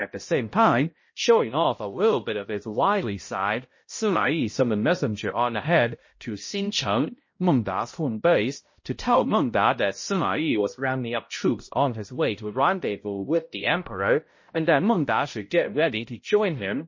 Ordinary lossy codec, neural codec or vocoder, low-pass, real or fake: MP3, 32 kbps; codec, 16 kHz, 0.5 kbps, X-Codec, HuBERT features, trained on balanced general audio; 7.2 kHz; fake